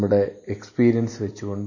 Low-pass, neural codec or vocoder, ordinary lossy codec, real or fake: 7.2 kHz; none; MP3, 32 kbps; real